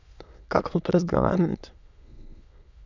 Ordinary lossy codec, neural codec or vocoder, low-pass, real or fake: none; autoencoder, 22.05 kHz, a latent of 192 numbers a frame, VITS, trained on many speakers; 7.2 kHz; fake